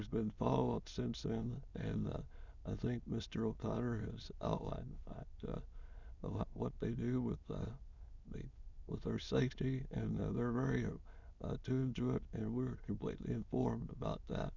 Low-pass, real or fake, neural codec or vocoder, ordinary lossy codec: 7.2 kHz; fake; autoencoder, 22.05 kHz, a latent of 192 numbers a frame, VITS, trained on many speakers; Opus, 64 kbps